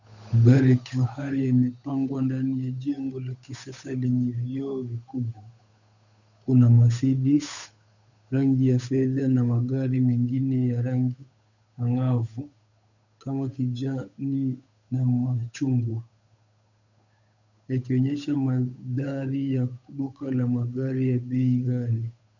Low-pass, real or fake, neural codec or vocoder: 7.2 kHz; fake; codec, 16 kHz, 8 kbps, FunCodec, trained on Chinese and English, 25 frames a second